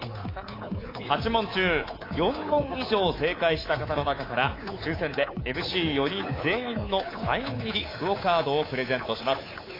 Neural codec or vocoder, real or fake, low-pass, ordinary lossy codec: codec, 24 kHz, 3.1 kbps, DualCodec; fake; 5.4 kHz; AAC, 24 kbps